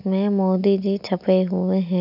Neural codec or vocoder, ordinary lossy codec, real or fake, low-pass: none; none; real; 5.4 kHz